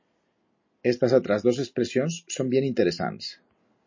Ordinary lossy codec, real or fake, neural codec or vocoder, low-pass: MP3, 32 kbps; real; none; 7.2 kHz